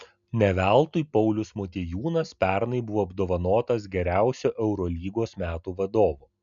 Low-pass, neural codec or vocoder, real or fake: 7.2 kHz; none; real